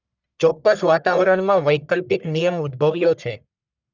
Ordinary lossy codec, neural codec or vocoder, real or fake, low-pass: none; codec, 44.1 kHz, 1.7 kbps, Pupu-Codec; fake; 7.2 kHz